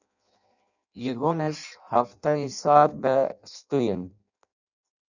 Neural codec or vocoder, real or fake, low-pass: codec, 16 kHz in and 24 kHz out, 0.6 kbps, FireRedTTS-2 codec; fake; 7.2 kHz